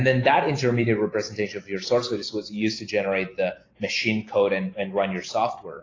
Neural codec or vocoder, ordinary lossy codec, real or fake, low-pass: none; AAC, 32 kbps; real; 7.2 kHz